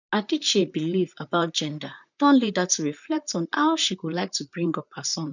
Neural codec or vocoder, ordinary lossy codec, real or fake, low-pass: vocoder, 44.1 kHz, 128 mel bands, Pupu-Vocoder; none; fake; 7.2 kHz